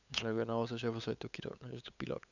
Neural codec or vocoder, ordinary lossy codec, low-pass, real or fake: codec, 16 kHz, 8 kbps, FunCodec, trained on LibriTTS, 25 frames a second; none; 7.2 kHz; fake